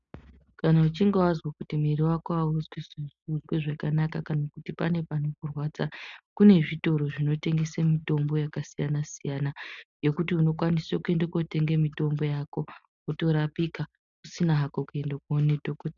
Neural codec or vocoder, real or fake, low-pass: none; real; 7.2 kHz